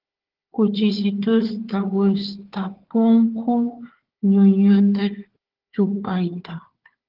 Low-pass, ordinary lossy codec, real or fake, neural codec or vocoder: 5.4 kHz; Opus, 16 kbps; fake; codec, 16 kHz, 4 kbps, FunCodec, trained on Chinese and English, 50 frames a second